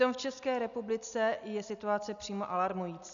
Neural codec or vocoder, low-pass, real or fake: none; 7.2 kHz; real